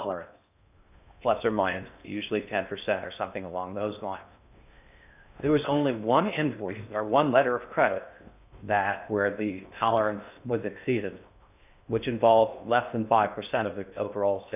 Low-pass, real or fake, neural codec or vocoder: 3.6 kHz; fake; codec, 16 kHz in and 24 kHz out, 0.6 kbps, FocalCodec, streaming, 4096 codes